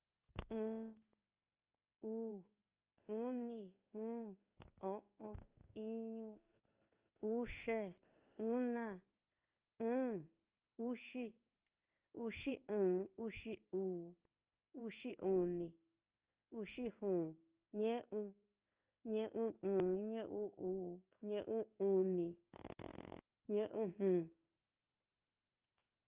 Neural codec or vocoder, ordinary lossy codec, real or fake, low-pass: codec, 16 kHz in and 24 kHz out, 1 kbps, XY-Tokenizer; Opus, 24 kbps; fake; 3.6 kHz